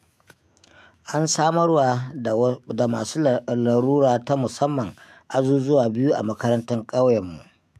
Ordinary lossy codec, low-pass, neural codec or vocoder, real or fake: AAC, 96 kbps; 14.4 kHz; autoencoder, 48 kHz, 128 numbers a frame, DAC-VAE, trained on Japanese speech; fake